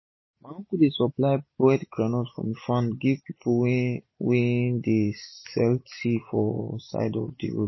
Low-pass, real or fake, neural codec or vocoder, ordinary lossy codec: 7.2 kHz; real; none; MP3, 24 kbps